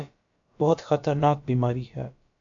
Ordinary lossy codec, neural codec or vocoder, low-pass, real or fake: AAC, 64 kbps; codec, 16 kHz, about 1 kbps, DyCAST, with the encoder's durations; 7.2 kHz; fake